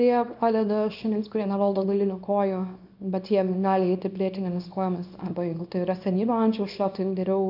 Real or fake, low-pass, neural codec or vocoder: fake; 5.4 kHz; codec, 24 kHz, 0.9 kbps, WavTokenizer, small release